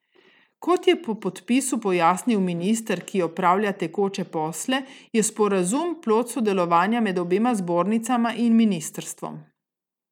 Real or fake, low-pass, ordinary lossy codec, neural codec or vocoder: real; 19.8 kHz; none; none